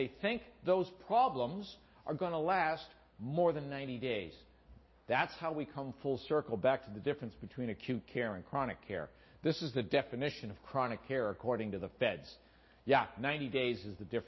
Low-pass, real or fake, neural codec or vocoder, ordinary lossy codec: 7.2 kHz; real; none; MP3, 24 kbps